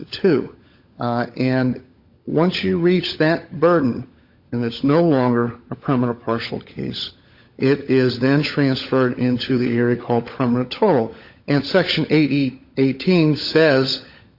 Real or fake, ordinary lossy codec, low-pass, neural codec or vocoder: fake; Opus, 64 kbps; 5.4 kHz; codec, 16 kHz, 16 kbps, FunCodec, trained on LibriTTS, 50 frames a second